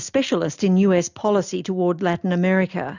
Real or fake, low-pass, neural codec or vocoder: real; 7.2 kHz; none